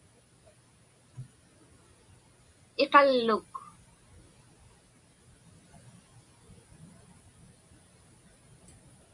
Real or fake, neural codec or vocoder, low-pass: real; none; 10.8 kHz